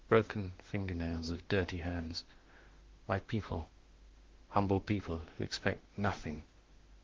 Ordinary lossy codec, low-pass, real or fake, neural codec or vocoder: Opus, 16 kbps; 7.2 kHz; fake; autoencoder, 48 kHz, 32 numbers a frame, DAC-VAE, trained on Japanese speech